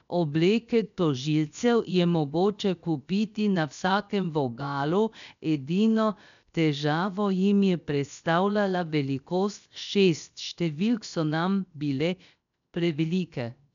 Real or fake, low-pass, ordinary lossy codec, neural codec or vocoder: fake; 7.2 kHz; none; codec, 16 kHz, 0.7 kbps, FocalCodec